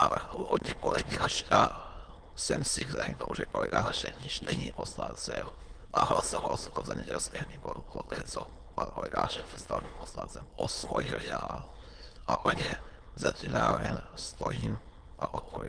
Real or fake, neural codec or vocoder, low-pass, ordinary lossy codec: fake; autoencoder, 22.05 kHz, a latent of 192 numbers a frame, VITS, trained on many speakers; 9.9 kHz; Opus, 24 kbps